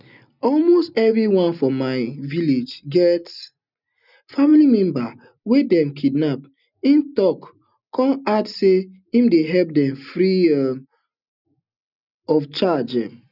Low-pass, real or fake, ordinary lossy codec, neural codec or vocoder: 5.4 kHz; real; none; none